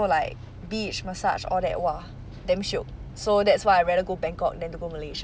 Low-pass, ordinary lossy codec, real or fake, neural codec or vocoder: none; none; real; none